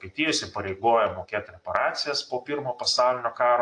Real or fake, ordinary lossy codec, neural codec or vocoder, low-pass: real; AAC, 48 kbps; none; 9.9 kHz